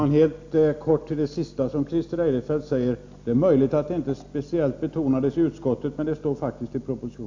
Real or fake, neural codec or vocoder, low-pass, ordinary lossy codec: real; none; 7.2 kHz; AAC, 48 kbps